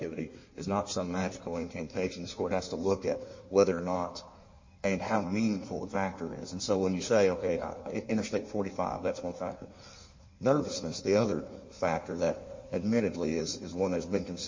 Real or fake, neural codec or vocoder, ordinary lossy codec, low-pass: fake; codec, 16 kHz in and 24 kHz out, 1.1 kbps, FireRedTTS-2 codec; MP3, 32 kbps; 7.2 kHz